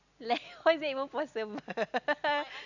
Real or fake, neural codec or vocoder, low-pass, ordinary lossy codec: real; none; 7.2 kHz; none